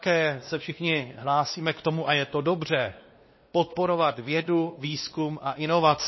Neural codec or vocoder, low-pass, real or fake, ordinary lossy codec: codec, 16 kHz, 2 kbps, X-Codec, WavLM features, trained on Multilingual LibriSpeech; 7.2 kHz; fake; MP3, 24 kbps